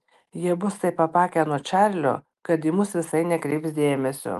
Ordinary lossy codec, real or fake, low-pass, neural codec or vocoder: Opus, 32 kbps; real; 14.4 kHz; none